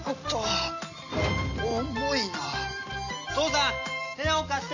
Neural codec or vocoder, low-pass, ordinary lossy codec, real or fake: none; 7.2 kHz; none; real